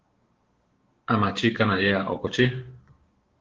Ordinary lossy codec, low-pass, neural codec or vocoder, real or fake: Opus, 16 kbps; 7.2 kHz; codec, 16 kHz, 6 kbps, DAC; fake